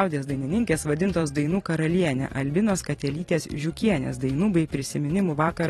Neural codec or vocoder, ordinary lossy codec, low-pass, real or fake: vocoder, 44.1 kHz, 128 mel bands every 512 samples, BigVGAN v2; AAC, 32 kbps; 19.8 kHz; fake